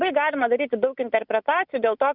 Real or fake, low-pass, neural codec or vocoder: real; 5.4 kHz; none